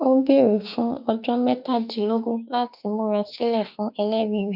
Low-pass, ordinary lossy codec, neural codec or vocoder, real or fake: 5.4 kHz; none; autoencoder, 48 kHz, 32 numbers a frame, DAC-VAE, trained on Japanese speech; fake